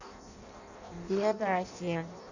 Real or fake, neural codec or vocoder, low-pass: fake; codec, 16 kHz in and 24 kHz out, 0.6 kbps, FireRedTTS-2 codec; 7.2 kHz